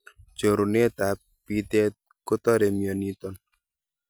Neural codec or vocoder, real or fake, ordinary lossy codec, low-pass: none; real; none; none